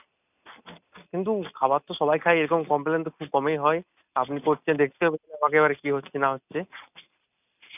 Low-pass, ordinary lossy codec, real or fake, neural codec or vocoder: 3.6 kHz; none; real; none